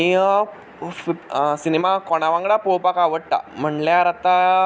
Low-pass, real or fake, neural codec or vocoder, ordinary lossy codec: none; real; none; none